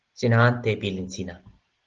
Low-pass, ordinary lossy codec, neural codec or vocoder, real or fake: 7.2 kHz; Opus, 16 kbps; none; real